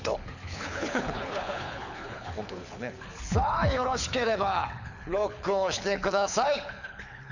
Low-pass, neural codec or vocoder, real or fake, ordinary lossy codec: 7.2 kHz; codec, 24 kHz, 6 kbps, HILCodec; fake; none